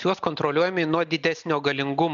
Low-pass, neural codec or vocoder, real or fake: 7.2 kHz; none; real